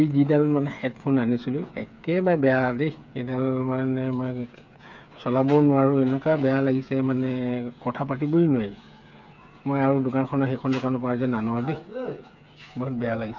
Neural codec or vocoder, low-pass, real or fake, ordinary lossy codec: codec, 16 kHz, 8 kbps, FreqCodec, smaller model; 7.2 kHz; fake; none